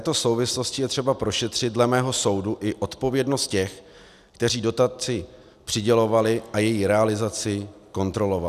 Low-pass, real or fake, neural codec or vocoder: 14.4 kHz; real; none